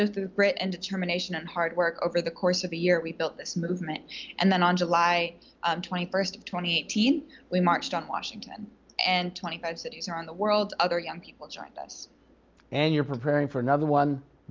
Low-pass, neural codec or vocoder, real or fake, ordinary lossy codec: 7.2 kHz; none; real; Opus, 24 kbps